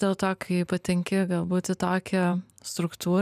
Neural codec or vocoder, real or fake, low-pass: vocoder, 44.1 kHz, 128 mel bands every 512 samples, BigVGAN v2; fake; 14.4 kHz